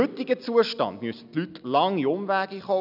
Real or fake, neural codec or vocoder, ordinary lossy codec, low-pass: real; none; none; 5.4 kHz